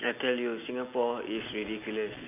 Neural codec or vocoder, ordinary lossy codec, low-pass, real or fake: none; none; 3.6 kHz; real